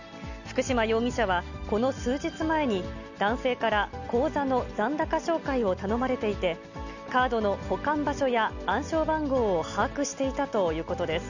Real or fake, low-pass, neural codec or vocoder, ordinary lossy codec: real; 7.2 kHz; none; none